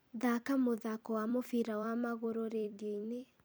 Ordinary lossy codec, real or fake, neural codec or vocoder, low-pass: none; fake; vocoder, 44.1 kHz, 128 mel bands every 256 samples, BigVGAN v2; none